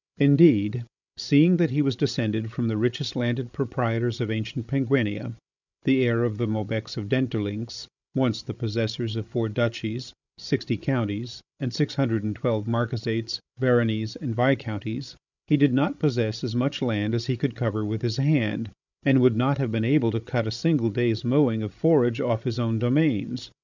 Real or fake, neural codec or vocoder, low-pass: fake; codec, 16 kHz, 16 kbps, FreqCodec, larger model; 7.2 kHz